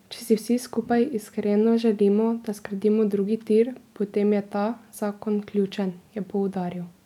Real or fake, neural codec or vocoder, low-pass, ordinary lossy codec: real; none; 19.8 kHz; none